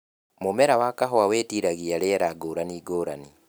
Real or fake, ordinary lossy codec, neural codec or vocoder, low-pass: real; none; none; none